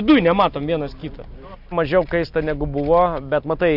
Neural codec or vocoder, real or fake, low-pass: none; real; 5.4 kHz